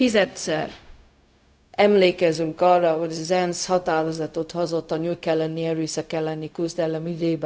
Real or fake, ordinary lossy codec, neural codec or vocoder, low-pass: fake; none; codec, 16 kHz, 0.4 kbps, LongCat-Audio-Codec; none